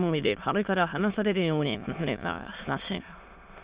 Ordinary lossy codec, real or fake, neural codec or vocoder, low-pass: Opus, 64 kbps; fake; autoencoder, 22.05 kHz, a latent of 192 numbers a frame, VITS, trained on many speakers; 3.6 kHz